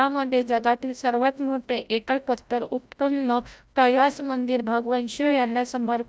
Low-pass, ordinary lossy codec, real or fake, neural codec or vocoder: none; none; fake; codec, 16 kHz, 0.5 kbps, FreqCodec, larger model